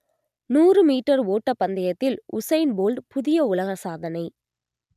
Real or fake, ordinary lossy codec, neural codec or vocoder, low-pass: real; none; none; 14.4 kHz